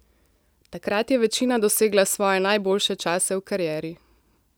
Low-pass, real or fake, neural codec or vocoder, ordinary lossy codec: none; real; none; none